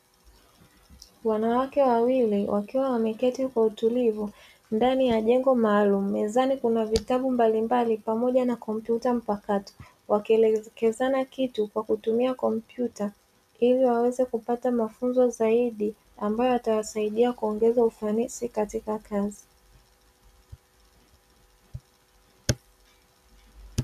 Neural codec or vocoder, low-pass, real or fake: none; 14.4 kHz; real